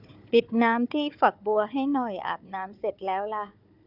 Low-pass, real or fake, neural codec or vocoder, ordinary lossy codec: 5.4 kHz; fake; codec, 16 kHz, 4 kbps, FunCodec, trained on Chinese and English, 50 frames a second; none